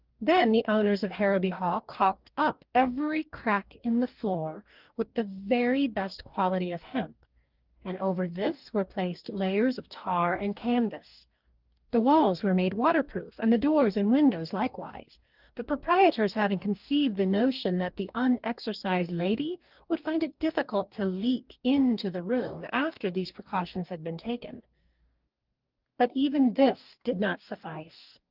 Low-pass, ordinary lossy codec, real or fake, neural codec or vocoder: 5.4 kHz; Opus, 24 kbps; fake; codec, 44.1 kHz, 2.6 kbps, DAC